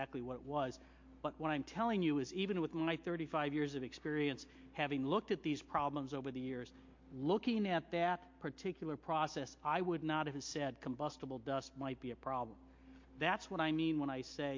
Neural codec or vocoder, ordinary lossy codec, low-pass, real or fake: none; MP3, 48 kbps; 7.2 kHz; real